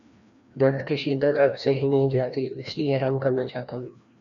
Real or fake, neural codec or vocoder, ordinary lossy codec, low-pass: fake; codec, 16 kHz, 1 kbps, FreqCodec, larger model; MP3, 96 kbps; 7.2 kHz